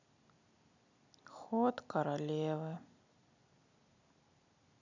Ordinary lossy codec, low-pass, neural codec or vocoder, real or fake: none; 7.2 kHz; none; real